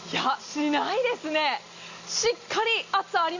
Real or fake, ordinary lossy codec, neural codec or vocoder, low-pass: real; Opus, 64 kbps; none; 7.2 kHz